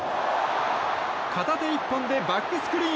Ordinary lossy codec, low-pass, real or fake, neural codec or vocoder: none; none; real; none